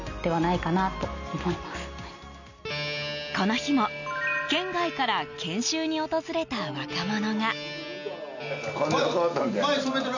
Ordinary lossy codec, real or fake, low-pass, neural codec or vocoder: none; real; 7.2 kHz; none